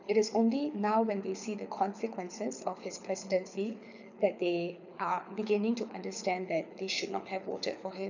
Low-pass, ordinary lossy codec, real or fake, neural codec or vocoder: 7.2 kHz; none; fake; codec, 24 kHz, 6 kbps, HILCodec